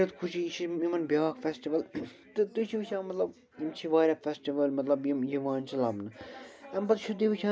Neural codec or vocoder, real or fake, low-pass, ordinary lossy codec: none; real; none; none